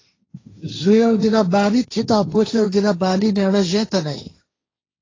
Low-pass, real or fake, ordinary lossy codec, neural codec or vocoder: 7.2 kHz; fake; AAC, 32 kbps; codec, 16 kHz, 1.1 kbps, Voila-Tokenizer